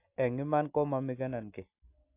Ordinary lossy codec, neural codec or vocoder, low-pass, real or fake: AAC, 24 kbps; none; 3.6 kHz; real